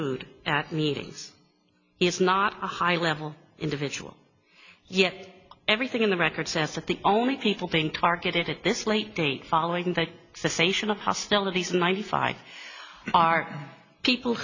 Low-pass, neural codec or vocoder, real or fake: 7.2 kHz; none; real